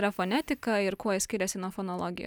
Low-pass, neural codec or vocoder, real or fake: 19.8 kHz; none; real